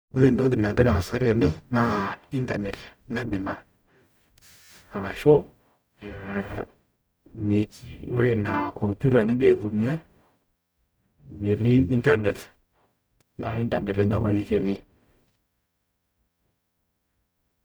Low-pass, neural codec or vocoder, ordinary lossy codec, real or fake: none; codec, 44.1 kHz, 0.9 kbps, DAC; none; fake